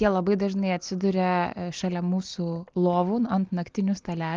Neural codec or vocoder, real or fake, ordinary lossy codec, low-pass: none; real; Opus, 32 kbps; 7.2 kHz